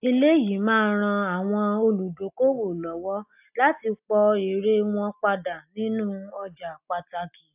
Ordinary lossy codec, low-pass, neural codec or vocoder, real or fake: AAC, 32 kbps; 3.6 kHz; none; real